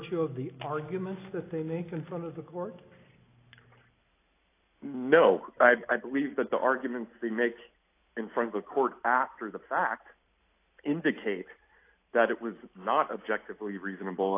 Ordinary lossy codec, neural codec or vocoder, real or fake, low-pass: AAC, 24 kbps; none; real; 3.6 kHz